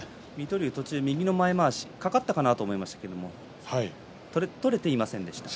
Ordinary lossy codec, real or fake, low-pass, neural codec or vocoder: none; real; none; none